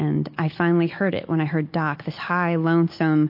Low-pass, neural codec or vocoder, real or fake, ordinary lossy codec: 5.4 kHz; none; real; MP3, 32 kbps